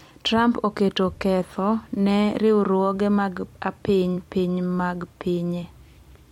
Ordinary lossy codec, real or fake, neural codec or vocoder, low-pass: MP3, 64 kbps; fake; vocoder, 44.1 kHz, 128 mel bands every 256 samples, BigVGAN v2; 19.8 kHz